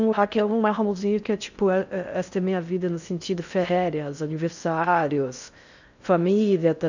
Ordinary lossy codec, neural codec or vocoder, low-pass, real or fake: none; codec, 16 kHz in and 24 kHz out, 0.6 kbps, FocalCodec, streaming, 4096 codes; 7.2 kHz; fake